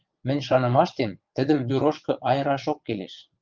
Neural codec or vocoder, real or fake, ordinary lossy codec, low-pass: vocoder, 22.05 kHz, 80 mel bands, WaveNeXt; fake; Opus, 24 kbps; 7.2 kHz